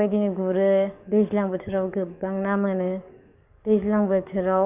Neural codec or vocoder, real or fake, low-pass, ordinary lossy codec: codec, 44.1 kHz, 7.8 kbps, DAC; fake; 3.6 kHz; none